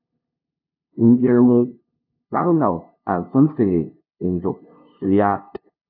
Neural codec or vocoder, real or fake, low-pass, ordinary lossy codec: codec, 16 kHz, 0.5 kbps, FunCodec, trained on LibriTTS, 25 frames a second; fake; 5.4 kHz; AAC, 48 kbps